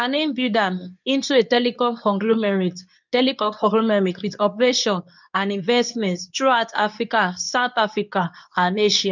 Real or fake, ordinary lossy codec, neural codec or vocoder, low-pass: fake; none; codec, 24 kHz, 0.9 kbps, WavTokenizer, medium speech release version 2; 7.2 kHz